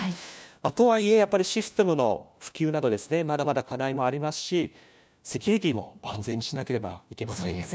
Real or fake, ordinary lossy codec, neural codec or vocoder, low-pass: fake; none; codec, 16 kHz, 1 kbps, FunCodec, trained on LibriTTS, 50 frames a second; none